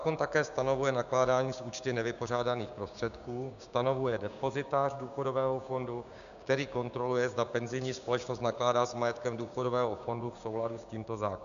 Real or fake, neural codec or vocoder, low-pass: fake; codec, 16 kHz, 6 kbps, DAC; 7.2 kHz